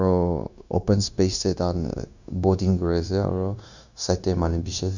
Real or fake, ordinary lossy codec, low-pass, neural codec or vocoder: fake; none; 7.2 kHz; codec, 16 kHz, 0.9 kbps, LongCat-Audio-Codec